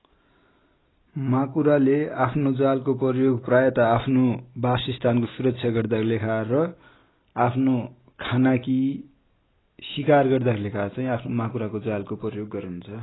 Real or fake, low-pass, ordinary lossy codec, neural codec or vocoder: real; 7.2 kHz; AAC, 16 kbps; none